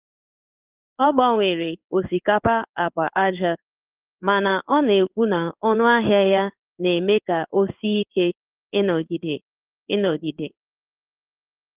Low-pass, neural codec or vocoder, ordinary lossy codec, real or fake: 3.6 kHz; codec, 16 kHz in and 24 kHz out, 1 kbps, XY-Tokenizer; Opus, 32 kbps; fake